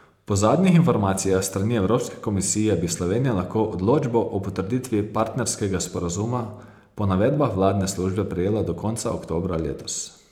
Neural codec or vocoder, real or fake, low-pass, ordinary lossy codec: vocoder, 44.1 kHz, 128 mel bands every 512 samples, BigVGAN v2; fake; 19.8 kHz; none